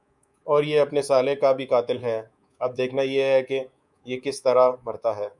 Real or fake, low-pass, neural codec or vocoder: fake; 10.8 kHz; codec, 24 kHz, 3.1 kbps, DualCodec